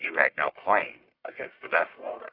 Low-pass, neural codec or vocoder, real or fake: 5.4 kHz; codec, 44.1 kHz, 1.7 kbps, Pupu-Codec; fake